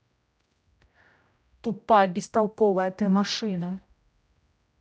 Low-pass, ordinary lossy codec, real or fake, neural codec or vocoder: none; none; fake; codec, 16 kHz, 0.5 kbps, X-Codec, HuBERT features, trained on general audio